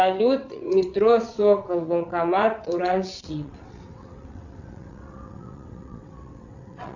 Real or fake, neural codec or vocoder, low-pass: fake; vocoder, 22.05 kHz, 80 mel bands, WaveNeXt; 7.2 kHz